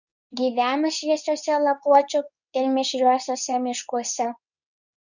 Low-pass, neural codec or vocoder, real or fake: 7.2 kHz; codec, 24 kHz, 0.9 kbps, WavTokenizer, medium speech release version 2; fake